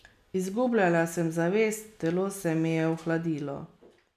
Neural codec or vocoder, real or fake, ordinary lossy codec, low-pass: none; real; none; 14.4 kHz